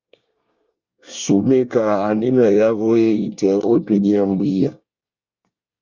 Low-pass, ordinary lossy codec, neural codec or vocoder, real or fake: 7.2 kHz; Opus, 64 kbps; codec, 24 kHz, 1 kbps, SNAC; fake